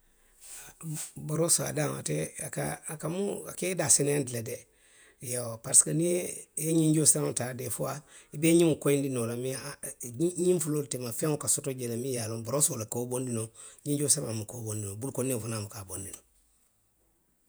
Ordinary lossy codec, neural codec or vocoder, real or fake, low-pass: none; vocoder, 48 kHz, 128 mel bands, Vocos; fake; none